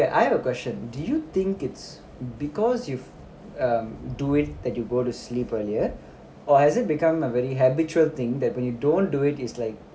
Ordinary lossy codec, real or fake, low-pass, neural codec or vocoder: none; real; none; none